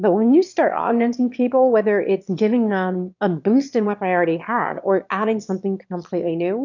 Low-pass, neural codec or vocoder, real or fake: 7.2 kHz; autoencoder, 22.05 kHz, a latent of 192 numbers a frame, VITS, trained on one speaker; fake